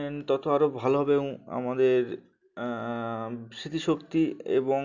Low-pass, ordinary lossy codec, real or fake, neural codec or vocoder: 7.2 kHz; none; real; none